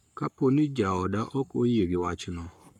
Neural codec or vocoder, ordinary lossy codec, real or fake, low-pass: codec, 44.1 kHz, 7.8 kbps, Pupu-Codec; none; fake; 19.8 kHz